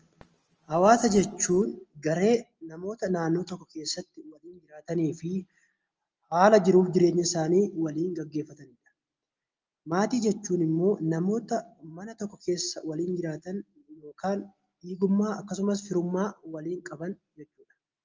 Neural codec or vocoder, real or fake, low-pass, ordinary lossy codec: none; real; 7.2 kHz; Opus, 24 kbps